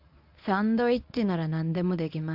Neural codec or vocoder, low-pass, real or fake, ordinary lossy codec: codec, 24 kHz, 0.9 kbps, WavTokenizer, medium speech release version 1; 5.4 kHz; fake; AAC, 48 kbps